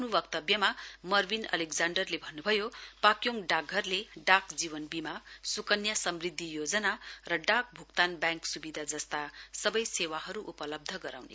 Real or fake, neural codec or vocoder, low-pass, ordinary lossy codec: real; none; none; none